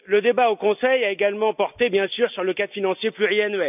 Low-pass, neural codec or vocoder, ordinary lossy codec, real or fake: 3.6 kHz; none; none; real